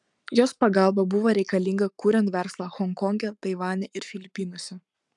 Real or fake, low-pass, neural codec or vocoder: fake; 10.8 kHz; codec, 44.1 kHz, 7.8 kbps, Pupu-Codec